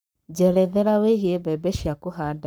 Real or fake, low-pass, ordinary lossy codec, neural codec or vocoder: fake; none; none; codec, 44.1 kHz, 7.8 kbps, Pupu-Codec